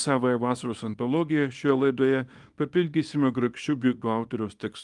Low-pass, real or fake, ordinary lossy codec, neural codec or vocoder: 10.8 kHz; fake; Opus, 32 kbps; codec, 24 kHz, 0.9 kbps, WavTokenizer, small release